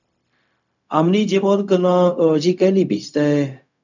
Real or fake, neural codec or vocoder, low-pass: fake; codec, 16 kHz, 0.4 kbps, LongCat-Audio-Codec; 7.2 kHz